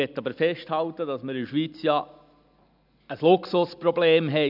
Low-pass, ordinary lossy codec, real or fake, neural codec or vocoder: 5.4 kHz; none; real; none